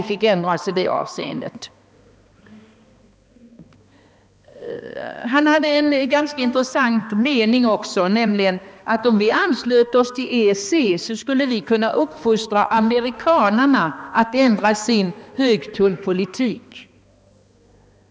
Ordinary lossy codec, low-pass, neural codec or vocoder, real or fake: none; none; codec, 16 kHz, 2 kbps, X-Codec, HuBERT features, trained on balanced general audio; fake